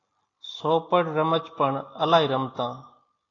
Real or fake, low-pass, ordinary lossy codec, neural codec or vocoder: real; 7.2 kHz; AAC, 32 kbps; none